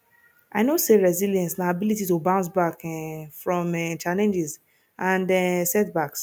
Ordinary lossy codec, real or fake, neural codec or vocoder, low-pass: none; real; none; none